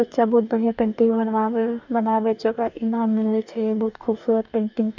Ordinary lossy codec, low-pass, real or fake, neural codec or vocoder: none; 7.2 kHz; fake; codec, 44.1 kHz, 2.6 kbps, DAC